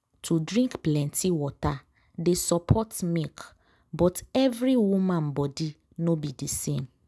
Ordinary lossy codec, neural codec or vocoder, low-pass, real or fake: none; none; none; real